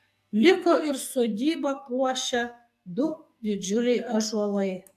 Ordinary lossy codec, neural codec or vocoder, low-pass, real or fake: AAC, 96 kbps; codec, 44.1 kHz, 2.6 kbps, SNAC; 14.4 kHz; fake